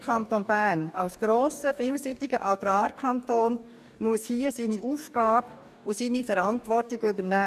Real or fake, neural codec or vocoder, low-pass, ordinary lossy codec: fake; codec, 44.1 kHz, 2.6 kbps, DAC; 14.4 kHz; none